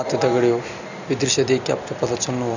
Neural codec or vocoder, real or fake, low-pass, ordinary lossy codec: none; real; 7.2 kHz; none